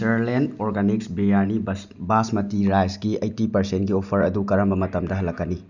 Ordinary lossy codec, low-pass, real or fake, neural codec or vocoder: none; 7.2 kHz; real; none